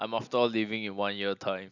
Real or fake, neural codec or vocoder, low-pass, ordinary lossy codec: real; none; 7.2 kHz; none